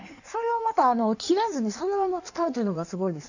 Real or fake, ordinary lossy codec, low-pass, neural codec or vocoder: fake; none; 7.2 kHz; codec, 16 kHz in and 24 kHz out, 1.1 kbps, FireRedTTS-2 codec